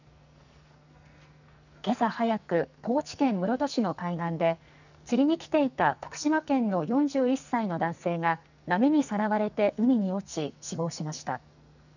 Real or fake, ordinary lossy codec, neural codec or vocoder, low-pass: fake; none; codec, 44.1 kHz, 2.6 kbps, SNAC; 7.2 kHz